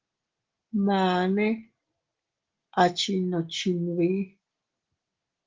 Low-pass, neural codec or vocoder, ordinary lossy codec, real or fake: 7.2 kHz; none; Opus, 16 kbps; real